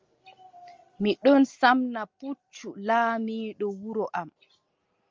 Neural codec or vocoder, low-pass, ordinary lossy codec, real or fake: none; 7.2 kHz; Opus, 32 kbps; real